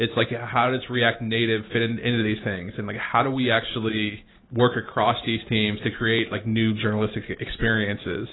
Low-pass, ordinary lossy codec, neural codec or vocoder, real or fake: 7.2 kHz; AAC, 16 kbps; none; real